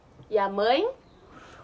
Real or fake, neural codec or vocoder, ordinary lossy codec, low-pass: real; none; none; none